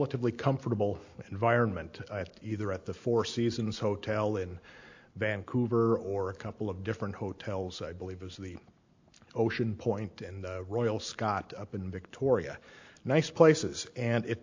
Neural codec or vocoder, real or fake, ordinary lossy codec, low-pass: none; real; AAC, 48 kbps; 7.2 kHz